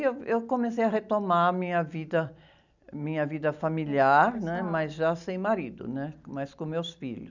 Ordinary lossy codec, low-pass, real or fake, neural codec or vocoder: none; 7.2 kHz; real; none